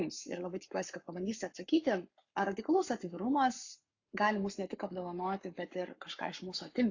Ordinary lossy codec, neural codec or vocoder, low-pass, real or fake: AAC, 48 kbps; codec, 44.1 kHz, 7.8 kbps, Pupu-Codec; 7.2 kHz; fake